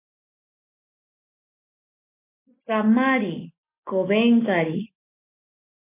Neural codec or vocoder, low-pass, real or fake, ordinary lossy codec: none; 3.6 kHz; real; MP3, 24 kbps